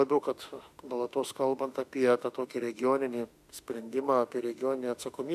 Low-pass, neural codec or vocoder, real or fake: 14.4 kHz; autoencoder, 48 kHz, 32 numbers a frame, DAC-VAE, trained on Japanese speech; fake